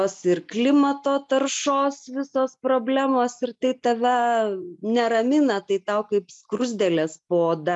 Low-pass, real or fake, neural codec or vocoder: 10.8 kHz; real; none